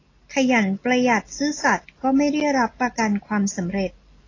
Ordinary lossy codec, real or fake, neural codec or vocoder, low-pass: AAC, 32 kbps; real; none; 7.2 kHz